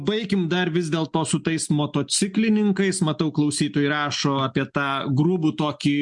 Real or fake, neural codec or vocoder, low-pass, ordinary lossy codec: real; none; 10.8 kHz; MP3, 64 kbps